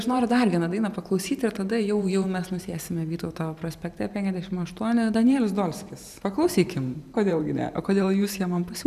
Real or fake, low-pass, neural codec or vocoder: fake; 14.4 kHz; vocoder, 44.1 kHz, 128 mel bands every 512 samples, BigVGAN v2